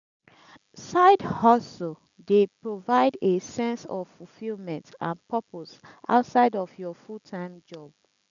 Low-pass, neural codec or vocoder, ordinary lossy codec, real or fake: 7.2 kHz; none; none; real